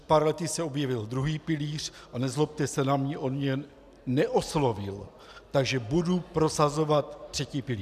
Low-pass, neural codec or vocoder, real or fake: 14.4 kHz; none; real